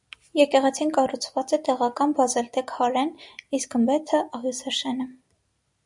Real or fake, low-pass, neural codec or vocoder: real; 10.8 kHz; none